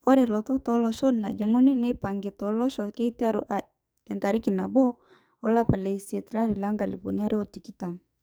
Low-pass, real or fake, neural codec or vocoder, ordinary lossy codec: none; fake; codec, 44.1 kHz, 2.6 kbps, SNAC; none